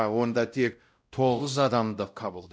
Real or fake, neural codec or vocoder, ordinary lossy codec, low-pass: fake; codec, 16 kHz, 0.5 kbps, X-Codec, WavLM features, trained on Multilingual LibriSpeech; none; none